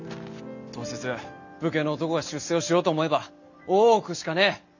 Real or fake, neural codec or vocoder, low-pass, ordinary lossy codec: real; none; 7.2 kHz; none